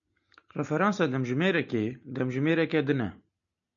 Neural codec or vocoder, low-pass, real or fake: none; 7.2 kHz; real